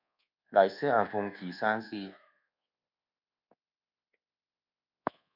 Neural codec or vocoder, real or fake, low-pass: codec, 24 kHz, 1.2 kbps, DualCodec; fake; 5.4 kHz